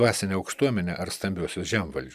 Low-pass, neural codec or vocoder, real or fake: 14.4 kHz; none; real